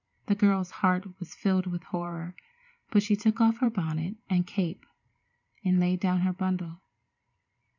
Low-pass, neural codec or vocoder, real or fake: 7.2 kHz; vocoder, 44.1 kHz, 128 mel bands every 512 samples, BigVGAN v2; fake